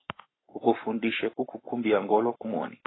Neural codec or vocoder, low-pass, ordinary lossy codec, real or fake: codec, 44.1 kHz, 7.8 kbps, Pupu-Codec; 7.2 kHz; AAC, 16 kbps; fake